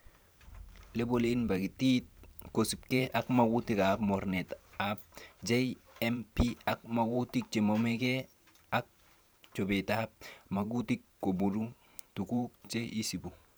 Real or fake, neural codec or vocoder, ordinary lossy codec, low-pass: fake; vocoder, 44.1 kHz, 128 mel bands every 512 samples, BigVGAN v2; none; none